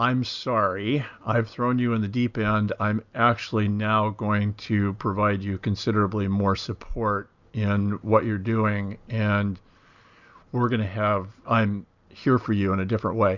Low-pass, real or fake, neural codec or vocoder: 7.2 kHz; real; none